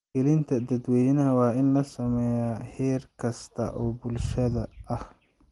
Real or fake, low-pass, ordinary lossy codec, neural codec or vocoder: real; 10.8 kHz; Opus, 24 kbps; none